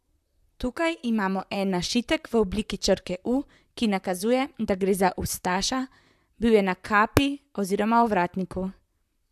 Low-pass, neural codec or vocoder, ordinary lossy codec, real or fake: 14.4 kHz; vocoder, 44.1 kHz, 128 mel bands, Pupu-Vocoder; AAC, 96 kbps; fake